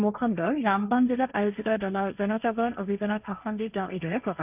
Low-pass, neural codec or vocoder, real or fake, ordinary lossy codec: 3.6 kHz; codec, 16 kHz, 1.1 kbps, Voila-Tokenizer; fake; none